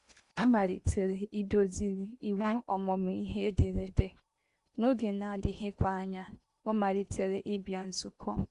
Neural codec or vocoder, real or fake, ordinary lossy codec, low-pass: codec, 16 kHz in and 24 kHz out, 0.8 kbps, FocalCodec, streaming, 65536 codes; fake; Opus, 64 kbps; 10.8 kHz